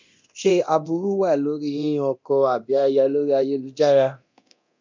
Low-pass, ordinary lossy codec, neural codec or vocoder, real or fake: 7.2 kHz; MP3, 64 kbps; codec, 24 kHz, 0.9 kbps, DualCodec; fake